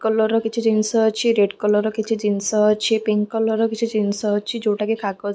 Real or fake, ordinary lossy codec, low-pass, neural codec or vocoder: real; none; none; none